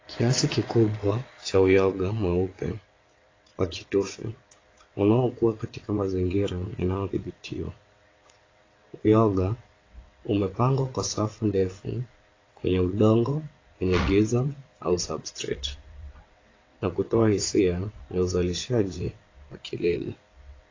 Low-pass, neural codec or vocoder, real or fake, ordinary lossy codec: 7.2 kHz; vocoder, 22.05 kHz, 80 mel bands, WaveNeXt; fake; AAC, 32 kbps